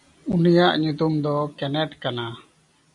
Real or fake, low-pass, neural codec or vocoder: real; 10.8 kHz; none